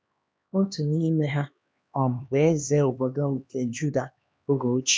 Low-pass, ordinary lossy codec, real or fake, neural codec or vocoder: none; none; fake; codec, 16 kHz, 2 kbps, X-Codec, HuBERT features, trained on LibriSpeech